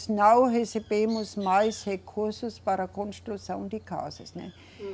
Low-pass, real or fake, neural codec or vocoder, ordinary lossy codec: none; real; none; none